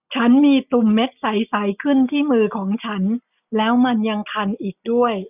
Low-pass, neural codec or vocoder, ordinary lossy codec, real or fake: 3.6 kHz; none; none; real